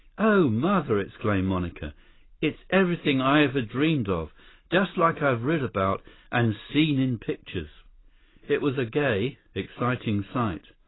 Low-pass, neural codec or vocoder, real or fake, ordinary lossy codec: 7.2 kHz; none; real; AAC, 16 kbps